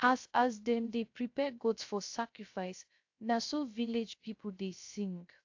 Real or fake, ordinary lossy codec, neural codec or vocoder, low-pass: fake; AAC, 48 kbps; codec, 16 kHz, 0.3 kbps, FocalCodec; 7.2 kHz